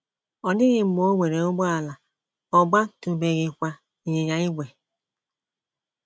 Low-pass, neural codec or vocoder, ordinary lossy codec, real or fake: none; none; none; real